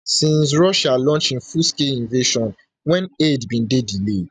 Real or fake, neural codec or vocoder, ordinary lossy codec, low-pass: real; none; none; 9.9 kHz